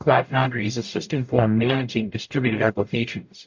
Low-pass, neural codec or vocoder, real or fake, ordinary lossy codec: 7.2 kHz; codec, 44.1 kHz, 0.9 kbps, DAC; fake; MP3, 48 kbps